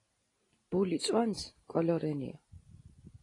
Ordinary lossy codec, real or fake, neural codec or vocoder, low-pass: AAC, 32 kbps; real; none; 10.8 kHz